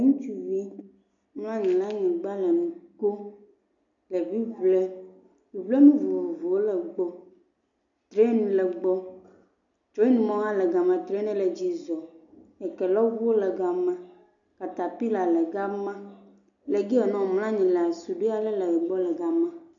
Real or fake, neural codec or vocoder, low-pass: real; none; 7.2 kHz